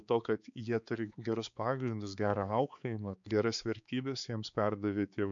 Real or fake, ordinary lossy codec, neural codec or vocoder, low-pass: fake; MP3, 64 kbps; codec, 16 kHz, 4 kbps, X-Codec, HuBERT features, trained on balanced general audio; 7.2 kHz